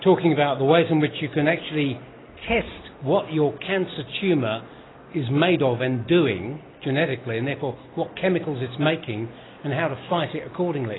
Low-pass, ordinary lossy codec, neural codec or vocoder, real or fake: 7.2 kHz; AAC, 16 kbps; none; real